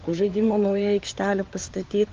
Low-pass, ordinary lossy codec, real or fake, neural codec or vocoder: 7.2 kHz; Opus, 32 kbps; fake; codec, 16 kHz, 4 kbps, FunCodec, trained on LibriTTS, 50 frames a second